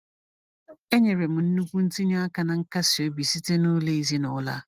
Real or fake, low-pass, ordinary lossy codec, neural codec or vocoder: real; 14.4 kHz; Opus, 24 kbps; none